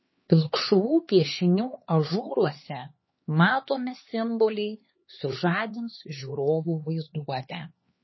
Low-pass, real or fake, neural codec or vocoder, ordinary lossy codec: 7.2 kHz; fake; codec, 16 kHz, 4 kbps, X-Codec, HuBERT features, trained on LibriSpeech; MP3, 24 kbps